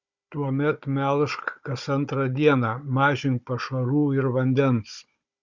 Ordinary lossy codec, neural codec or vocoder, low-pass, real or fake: Opus, 64 kbps; codec, 16 kHz, 16 kbps, FunCodec, trained on Chinese and English, 50 frames a second; 7.2 kHz; fake